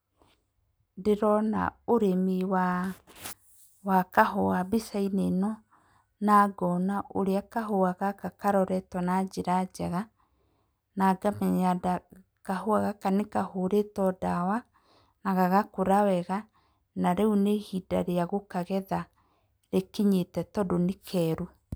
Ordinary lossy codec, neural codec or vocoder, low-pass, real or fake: none; none; none; real